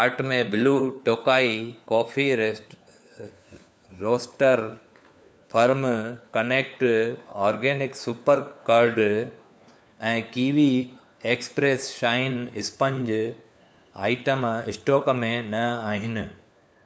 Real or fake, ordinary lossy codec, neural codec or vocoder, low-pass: fake; none; codec, 16 kHz, 4 kbps, FunCodec, trained on LibriTTS, 50 frames a second; none